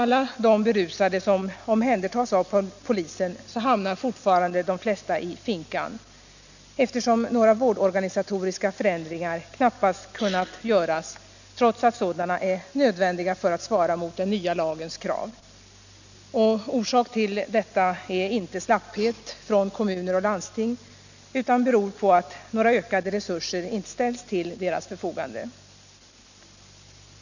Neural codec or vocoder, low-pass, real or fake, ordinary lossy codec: none; 7.2 kHz; real; none